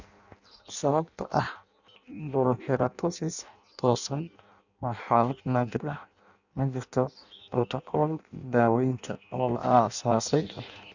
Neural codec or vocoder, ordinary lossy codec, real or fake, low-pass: codec, 16 kHz in and 24 kHz out, 0.6 kbps, FireRedTTS-2 codec; none; fake; 7.2 kHz